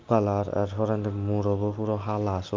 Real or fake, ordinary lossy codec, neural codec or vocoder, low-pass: real; Opus, 24 kbps; none; 7.2 kHz